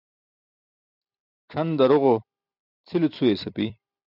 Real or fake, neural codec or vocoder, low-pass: fake; vocoder, 44.1 kHz, 128 mel bands every 256 samples, BigVGAN v2; 5.4 kHz